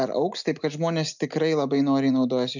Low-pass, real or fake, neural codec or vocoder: 7.2 kHz; real; none